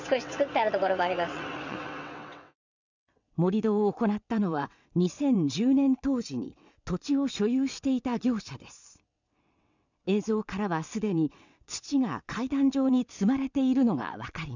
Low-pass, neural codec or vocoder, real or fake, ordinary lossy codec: 7.2 kHz; vocoder, 22.05 kHz, 80 mel bands, WaveNeXt; fake; none